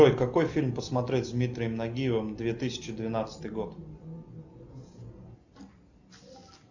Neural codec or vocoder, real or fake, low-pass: none; real; 7.2 kHz